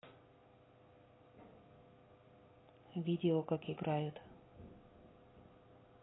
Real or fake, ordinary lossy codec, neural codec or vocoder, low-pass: real; AAC, 16 kbps; none; 7.2 kHz